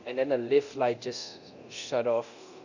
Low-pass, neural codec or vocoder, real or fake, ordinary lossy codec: 7.2 kHz; codec, 24 kHz, 0.9 kbps, DualCodec; fake; none